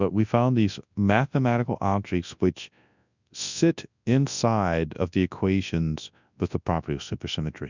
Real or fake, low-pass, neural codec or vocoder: fake; 7.2 kHz; codec, 24 kHz, 0.9 kbps, WavTokenizer, large speech release